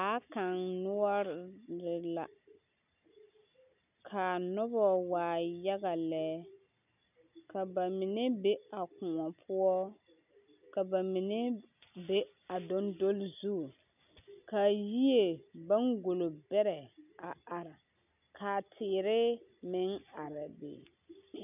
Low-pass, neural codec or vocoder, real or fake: 3.6 kHz; none; real